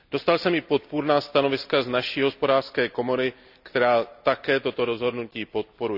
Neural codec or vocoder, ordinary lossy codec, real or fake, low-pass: none; none; real; 5.4 kHz